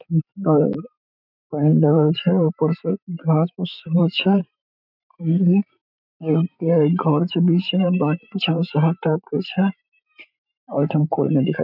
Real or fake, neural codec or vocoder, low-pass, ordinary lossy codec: fake; vocoder, 44.1 kHz, 128 mel bands every 512 samples, BigVGAN v2; 5.4 kHz; none